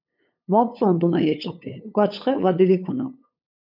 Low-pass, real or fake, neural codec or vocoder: 5.4 kHz; fake; codec, 16 kHz, 8 kbps, FunCodec, trained on LibriTTS, 25 frames a second